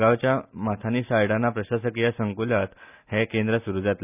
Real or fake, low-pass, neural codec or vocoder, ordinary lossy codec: real; 3.6 kHz; none; none